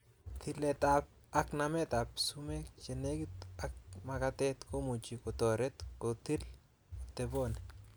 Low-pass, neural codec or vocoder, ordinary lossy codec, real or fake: none; none; none; real